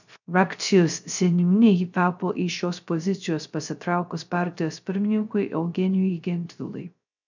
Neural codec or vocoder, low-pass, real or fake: codec, 16 kHz, 0.3 kbps, FocalCodec; 7.2 kHz; fake